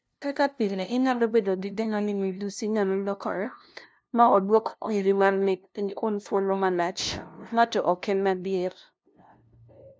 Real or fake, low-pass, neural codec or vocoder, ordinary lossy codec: fake; none; codec, 16 kHz, 0.5 kbps, FunCodec, trained on LibriTTS, 25 frames a second; none